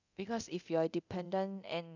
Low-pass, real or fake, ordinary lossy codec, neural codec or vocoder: 7.2 kHz; fake; none; codec, 24 kHz, 0.9 kbps, DualCodec